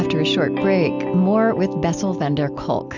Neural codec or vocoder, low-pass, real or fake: none; 7.2 kHz; real